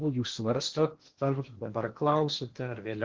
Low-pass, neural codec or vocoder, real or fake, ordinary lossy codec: 7.2 kHz; codec, 16 kHz in and 24 kHz out, 0.8 kbps, FocalCodec, streaming, 65536 codes; fake; Opus, 16 kbps